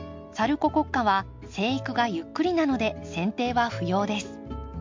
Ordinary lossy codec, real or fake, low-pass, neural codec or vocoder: MP3, 48 kbps; real; 7.2 kHz; none